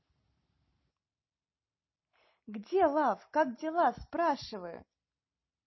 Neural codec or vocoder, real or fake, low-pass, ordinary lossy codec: none; real; 7.2 kHz; MP3, 24 kbps